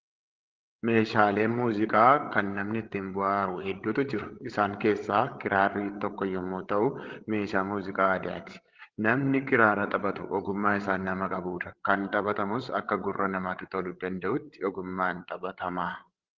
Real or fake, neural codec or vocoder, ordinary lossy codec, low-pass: fake; codec, 16 kHz, 16 kbps, FreqCodec, larger model; Opus, 16 kbps; 7.2 kHz